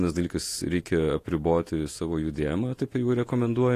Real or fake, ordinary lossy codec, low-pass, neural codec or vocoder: fake; AAC, 48 kbps; 14.4 kHz; vocoder, 48 kHz, 128 mel bands, Vocos